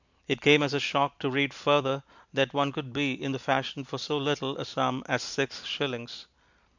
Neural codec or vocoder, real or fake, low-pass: none; real; 7.2 kHz